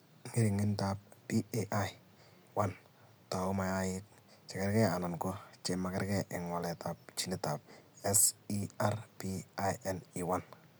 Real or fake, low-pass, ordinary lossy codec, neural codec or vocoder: real; none; none; none